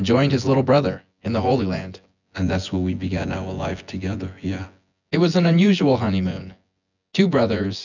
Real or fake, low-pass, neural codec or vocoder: fake; 7.2 kHz; vocoder, 24 kHz, 100 mel bands, Vocos